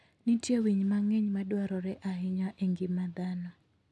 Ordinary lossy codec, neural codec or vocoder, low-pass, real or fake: none; none; none; real